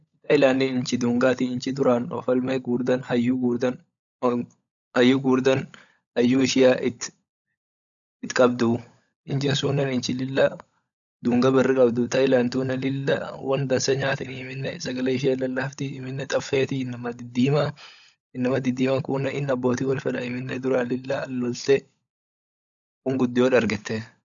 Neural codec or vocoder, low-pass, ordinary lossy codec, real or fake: codec, 16 kHz, 16 kbps, FunCodec, trained on LibriTTS, 50 frames a second; 7.2 kHz; none; fake